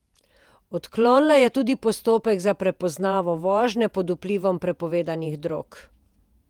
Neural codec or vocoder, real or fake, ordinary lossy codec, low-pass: vocoder, 48 kHz, 128 mel bands, Vocos; fake; Opus, 32 kbps; 19.8 kHz